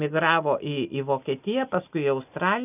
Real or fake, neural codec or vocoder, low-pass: real; none; 3.6 kHz